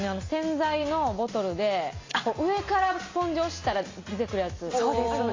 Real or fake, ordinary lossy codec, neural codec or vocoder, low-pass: real; AAC, 32 kbps; none; 7.2 kHz